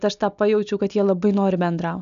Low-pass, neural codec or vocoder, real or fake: 7.2 kHz; none; real